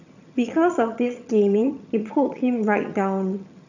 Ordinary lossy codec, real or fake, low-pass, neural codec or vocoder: none; fake; 7.2 kHz; vocoder, 22.05 kHz, 80 mel bands, HiFi-GAN